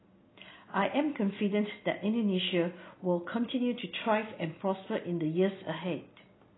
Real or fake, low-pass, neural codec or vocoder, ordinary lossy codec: real; 7.2 kHz; none; AAC, 16 kbps